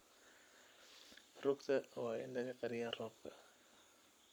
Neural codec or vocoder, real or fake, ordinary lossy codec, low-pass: codec, 44.1 kHz, 7.8 kbps, Pupu-Codec; fake; none; none